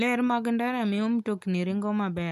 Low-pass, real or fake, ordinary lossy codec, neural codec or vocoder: 14.4 kHz; real; none; none